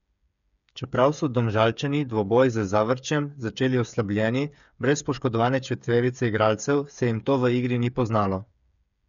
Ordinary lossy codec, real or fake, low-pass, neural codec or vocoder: none; fake; 7.2 kHz; codec, 16 kHz, 8 kbps, FreqCodec, smaller model